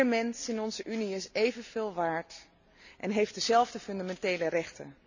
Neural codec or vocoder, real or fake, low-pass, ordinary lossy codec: none; real; 7.2 kHz; none